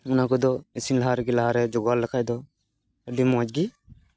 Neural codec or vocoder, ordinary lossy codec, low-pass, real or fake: none; none; none; real